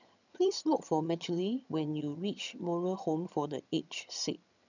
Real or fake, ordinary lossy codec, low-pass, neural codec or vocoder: fake; none; 7.2 kHz; vocoder, 22.05 kHz, 80 mel bands, HiFi-GAN